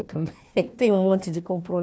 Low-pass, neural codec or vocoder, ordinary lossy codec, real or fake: none; codec, 16 kHz, 1 kbps, FunCodec, trained on Chinese and English, 50 frames a second; none; fake